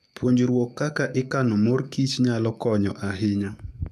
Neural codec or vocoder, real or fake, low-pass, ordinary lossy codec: codec, 44.1 kHz, 7.8 kbps, DAC; fake; 14.4 kHz; none